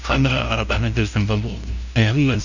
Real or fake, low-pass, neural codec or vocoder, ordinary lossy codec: fake; 7.2 kHz; codec, 16 kHz, 0.5 kbps, FunCodec, trained on LibriTTS, 25 frames a second; none